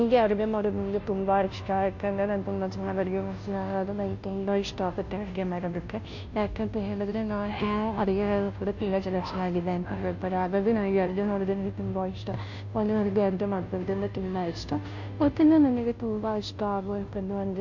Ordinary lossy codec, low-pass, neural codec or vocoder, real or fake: MP3, 48 kbps; 7.2 kHz; codec, 16 kHz, 0.5 kbps, FunCodec, trained on Chinese and English, 25 frames a second; fake